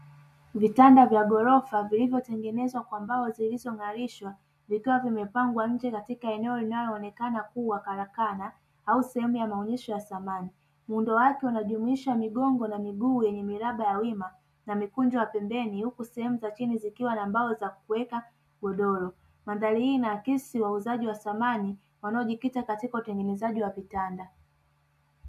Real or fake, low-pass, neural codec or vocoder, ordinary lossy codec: real; 14.4 kHz; none; MP3, 96 kbps